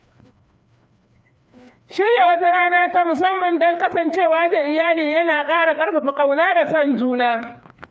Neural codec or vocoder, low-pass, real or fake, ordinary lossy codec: codec, 16 kHz, 2 kbps, FreqCodec, larger model; none; fake; none